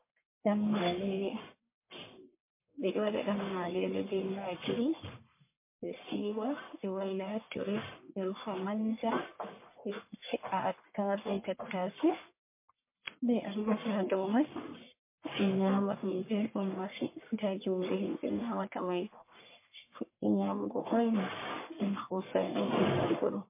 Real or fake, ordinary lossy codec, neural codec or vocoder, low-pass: fake; MP3, 24 kbps; codec, 44.1 kHz, 1.7 kbps, Pupu-Codec; 3.6 kHz